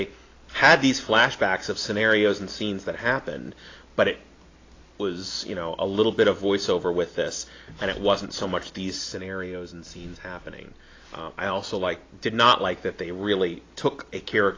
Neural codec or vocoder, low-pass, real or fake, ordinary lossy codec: none; 7.2 kHz; real; AAC, 32 kbps